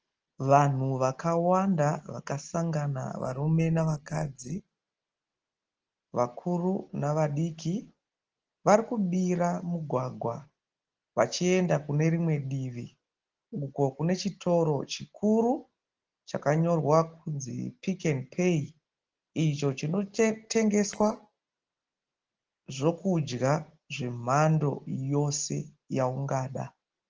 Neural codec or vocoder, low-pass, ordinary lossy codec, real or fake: none; 7.2 kHz; Opus, 16 kbps; real